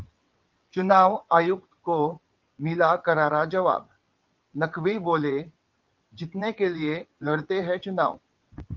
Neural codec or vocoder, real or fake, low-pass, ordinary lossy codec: vocoder, 22.05 kHz, 80 mel bands, WaveNeXt; fake; 7.2 kHz; Opus, 32 kbps